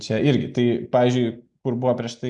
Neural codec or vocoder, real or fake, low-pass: none; real; 10.8 kHz